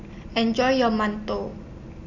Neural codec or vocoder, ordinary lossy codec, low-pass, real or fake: none; none; 7.2 kHz; real